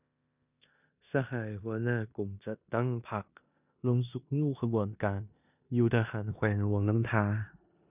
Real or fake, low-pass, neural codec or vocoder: fake; 3.6 kHz; codec, 16 kHz in and 24 kHz out, 0.9 kbps, LongCat-Audio-Codec, four codebook decoder